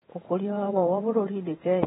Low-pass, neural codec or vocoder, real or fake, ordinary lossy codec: 19.8 kHz; vocoder, 48 kHz, 128 mel bands, Vocos; fake; AAC, 16 kbps